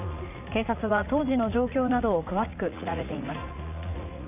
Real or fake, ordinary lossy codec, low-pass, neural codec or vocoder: fake; none; 3.6 kHz; vocoder, 44.1 kHz, 80 mel bands, Vocos